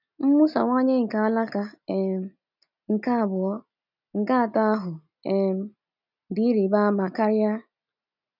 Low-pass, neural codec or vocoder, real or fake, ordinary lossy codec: 5.4 kHz; none; real; none